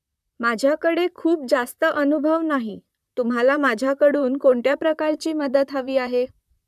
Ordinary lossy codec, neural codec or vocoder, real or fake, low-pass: none; vocoder, 44.1 kHz, 128 mel bands, Pupu-Vocoder; fake; 14.4 kHz